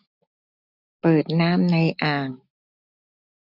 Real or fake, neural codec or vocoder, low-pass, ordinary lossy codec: real; none; 5.4 kHz; none